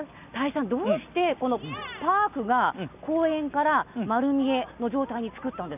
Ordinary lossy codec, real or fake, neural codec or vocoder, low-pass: none; real; none; 3.6 kHz